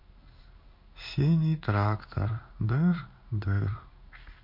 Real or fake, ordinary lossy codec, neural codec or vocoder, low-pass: fake; MP3, 32 kbps; codec, 16 kHz in and 24 kHz out, 1 kbps, XY-Tokenizer; 5.4 kHz